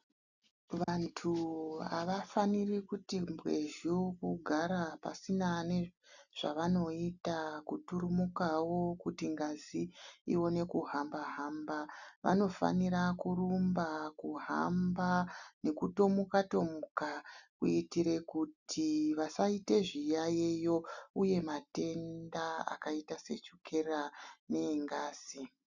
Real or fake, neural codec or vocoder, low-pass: real; none; 7.2 kHz